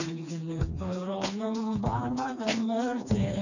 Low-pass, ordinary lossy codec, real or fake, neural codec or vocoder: 7.2 kHz; none; fake; codec, 16 kHz, 2 kbps, FreqCodec, smaller model